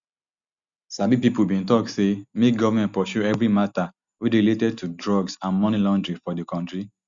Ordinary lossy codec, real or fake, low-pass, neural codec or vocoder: Opus, 64 kbps; real; 7.2 kHz; none